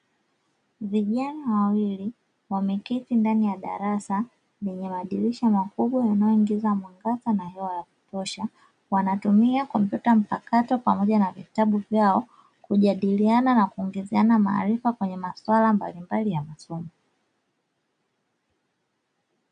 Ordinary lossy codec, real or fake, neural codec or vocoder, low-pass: MP3, 96 kbps; real; none; 9.9 kHz